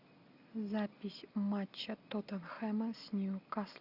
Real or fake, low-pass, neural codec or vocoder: real; 5.4 kHz; none